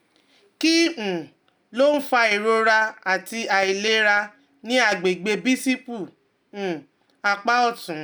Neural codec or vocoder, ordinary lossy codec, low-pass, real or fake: none; none; none; real